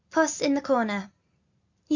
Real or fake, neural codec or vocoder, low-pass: real; none; 7.2 kHz